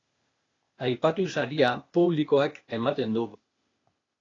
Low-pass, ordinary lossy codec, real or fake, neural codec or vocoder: 7.2 kHz; AAC, 32 kbps; fake; codec, 16 kHz, 0.8 kbps, ZipCodec